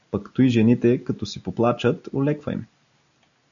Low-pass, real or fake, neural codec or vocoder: 7.2 kHz; real; none